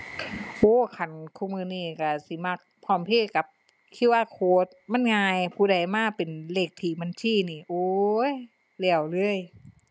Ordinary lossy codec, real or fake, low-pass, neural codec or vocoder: none; real; none; none